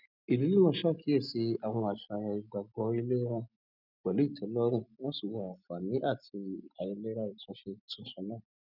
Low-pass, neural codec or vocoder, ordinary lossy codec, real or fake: 5.4 kHz; none; none; real